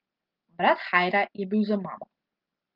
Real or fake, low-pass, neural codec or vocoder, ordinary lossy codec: real; 5.4 kHz; none; Opus, 32 kbps